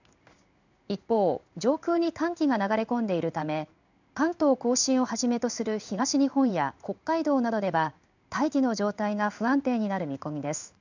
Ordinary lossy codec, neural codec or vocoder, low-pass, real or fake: none; codec, 16 kHz in and 24 kHz out, 1 kbps, XY-Tokenizer; 7.2 kHz; fake